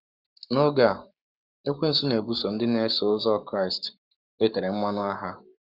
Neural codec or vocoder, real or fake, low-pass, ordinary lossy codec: codec, 44.1 kHz, 7.8 kbps, Pupu-Codec; fake; 5.4 kHz; Opus, 64 kbps